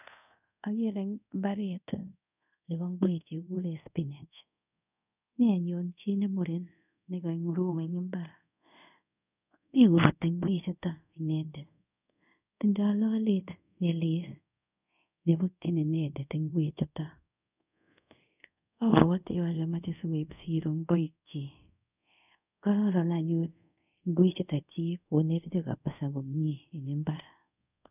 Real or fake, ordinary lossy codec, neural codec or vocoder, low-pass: fake; none; codec, 24 kHz, 0.5 kbps, DualCodec; 3.6 kHz